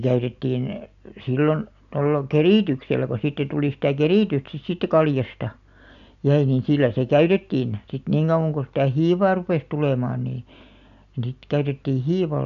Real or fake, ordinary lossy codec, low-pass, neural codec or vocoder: real; MP3, 96 kbps; 7.2 kHz; none